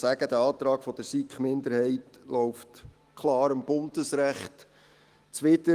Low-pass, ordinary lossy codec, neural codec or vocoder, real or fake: 14.4 kHz; Opus, 24 kbps; autoencoder, 48 kHz, 128 numbers a frame, DAC-VAE, trained on Japanese speech; fake